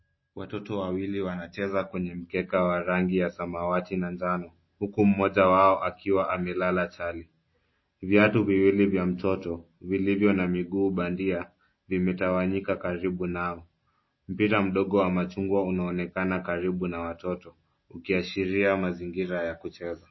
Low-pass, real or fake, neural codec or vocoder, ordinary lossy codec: 7.2 kHz; real; none; MP3, 24 kbps